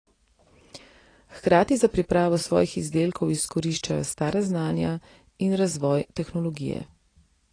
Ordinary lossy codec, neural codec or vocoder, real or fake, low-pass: AAC, 32 kbps; none; real; 9.9 kHz